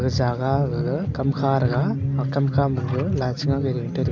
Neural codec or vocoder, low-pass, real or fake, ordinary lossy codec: none; 7.2 kHz; real; none